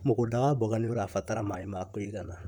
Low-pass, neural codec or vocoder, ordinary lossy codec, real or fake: 19.8 kHz; vocoder, 44.1 kHz, 128 mel bands, Pupu-Vocoder; none; fake